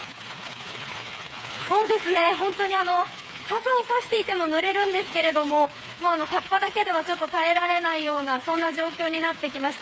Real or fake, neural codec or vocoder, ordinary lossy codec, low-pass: fake; codec, 16 kHz, 4 kbps, FreqCodec, smaller model; none; none